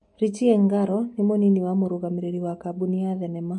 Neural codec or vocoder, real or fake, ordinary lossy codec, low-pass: none; real; MP3, 48 kbps; 10.8 kHz